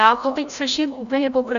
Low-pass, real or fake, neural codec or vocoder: 7.2 kHz; fake; codec, 16 kHz, 0.5 kbps, FreqCodec, larger model